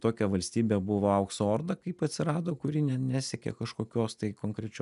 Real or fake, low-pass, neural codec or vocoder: real; 10.8 kHz; none